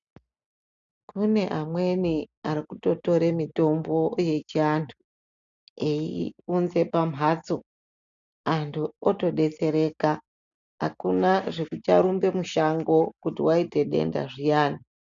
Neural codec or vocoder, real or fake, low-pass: none; real; 7.2 kHz